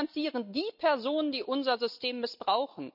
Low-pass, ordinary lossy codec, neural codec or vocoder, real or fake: 5.4 kHz; none; none; real